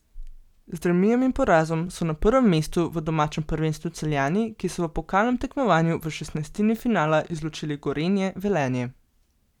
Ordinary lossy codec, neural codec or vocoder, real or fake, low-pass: none; none; real; 19.8 kHz